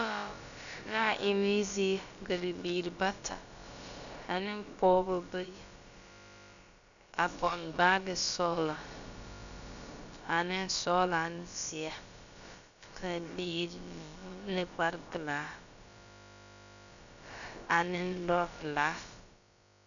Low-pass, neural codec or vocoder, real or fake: 7.2 kHz; codec, 16 kHz, about 1 kbps, DyCAST, with the encoder's durations; fake